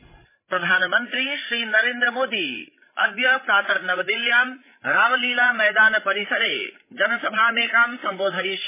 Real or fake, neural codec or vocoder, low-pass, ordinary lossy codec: fake; codec, 16 kHz, 8 kbps, FreqCodec, larger model; 3.6 kHz; MP3, 16 kbps